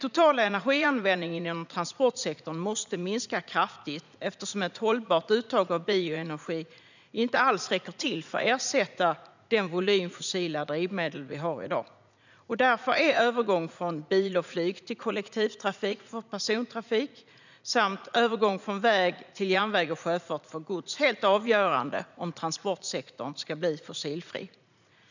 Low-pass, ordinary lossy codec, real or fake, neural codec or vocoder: 7.2 kHz; none; fake; vocoder, 44.1 kHz, 80 mel bands, Vocos